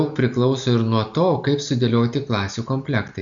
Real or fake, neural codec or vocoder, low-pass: real; none; 7.2 kHz